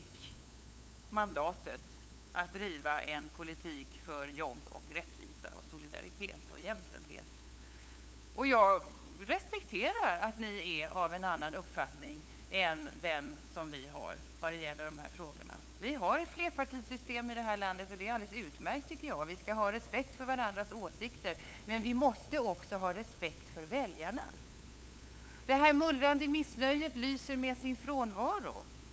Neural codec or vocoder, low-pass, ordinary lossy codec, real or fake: codec, 16 kHz, 8 kbps, FunCodec, trained on LibriTTS, 25 frames a second; none; none; fake